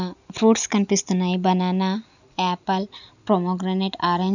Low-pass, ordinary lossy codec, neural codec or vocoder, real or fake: 7.2 kHz; none; none; real